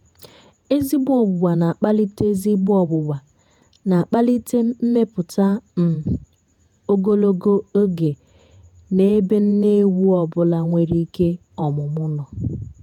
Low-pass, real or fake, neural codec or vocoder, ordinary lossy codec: 19.8 kHz; fake; vocoder, 48 kHz, 128 mel bands, Vocos; none